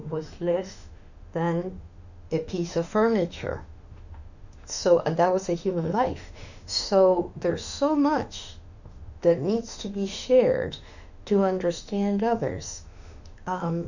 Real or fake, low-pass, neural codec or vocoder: fake; 7.2 kHz; autoencoder, 48 kHz, 32 numbers a frame, DAC-VAE, trained on Japanese speech